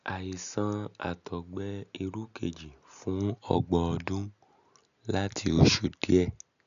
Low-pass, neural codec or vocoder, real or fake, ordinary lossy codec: 7.2 kHz; none; real; none